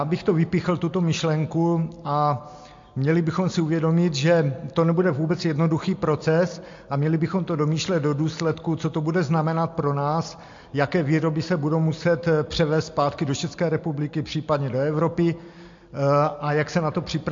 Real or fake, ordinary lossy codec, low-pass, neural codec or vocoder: real; MP3, 48 kbps; 7.2 kHz; none